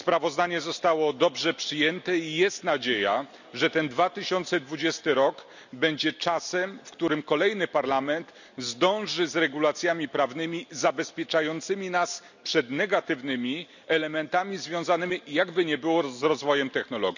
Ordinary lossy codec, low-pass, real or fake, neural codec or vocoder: none; 7.2 kHz; real; none